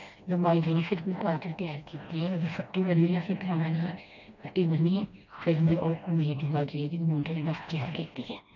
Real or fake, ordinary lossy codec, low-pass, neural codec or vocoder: fake; none; 7.2 kHz; codec, 16 kHz, 1 kbps, FreqCodec, smaller model